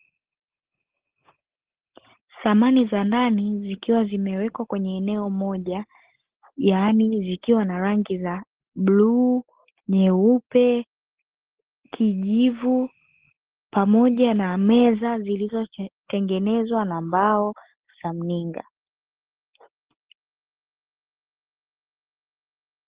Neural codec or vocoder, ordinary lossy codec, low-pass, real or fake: none; Opus, 16 kbps; 3.6 kHz; real